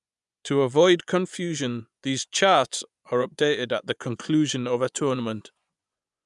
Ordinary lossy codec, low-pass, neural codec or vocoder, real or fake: none; 10.8 kHz; vocoder, 24 kHz, 100 mel bands, Vocos; fake